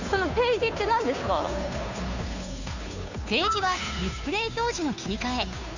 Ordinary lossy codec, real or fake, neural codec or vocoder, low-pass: none; fake; codec, 16 kHz, 2 kbps, FunCodec, trained on Chinese and English, 25 frames a second; 7.2 kHz